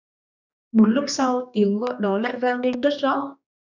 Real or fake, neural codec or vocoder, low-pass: fake; codec, 16 kHz, 2 kbps, X-Codec, HuBERT features, trained on general audio; 7.2 kHz